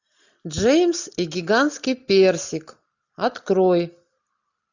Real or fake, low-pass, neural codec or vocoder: real; 7.2 kHz; none